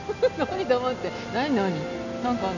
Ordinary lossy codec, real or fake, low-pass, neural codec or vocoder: none; real; 7.2 kHz; none